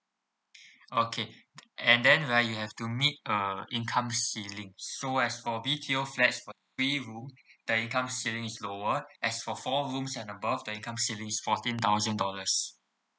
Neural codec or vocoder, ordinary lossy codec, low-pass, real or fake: none; none; none; real